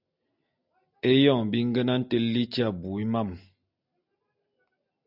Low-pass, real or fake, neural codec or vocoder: 5.4 kHz; real; none